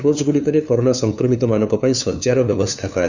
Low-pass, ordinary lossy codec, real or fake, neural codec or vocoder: 7.2 kHz; none; fake; codec, 16 kHz, 2 kbps, FunCodec, trained on LibriTTS, 25 frames a second